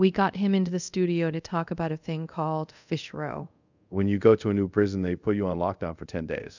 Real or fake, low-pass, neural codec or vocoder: fake; 7.2 kHz; codec, 24 kHz, 0.5 kbps, DualCodec